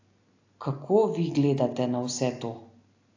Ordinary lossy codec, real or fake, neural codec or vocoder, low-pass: AAC, 48 kbps; real; none; 7.2 kHz